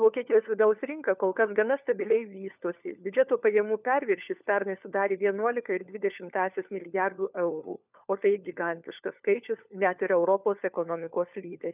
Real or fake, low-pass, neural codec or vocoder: fake; 3.6 kHz; codec, 16 kHz, 4 kbps, FunCodec, trained on LibriTTS, 50 frames a second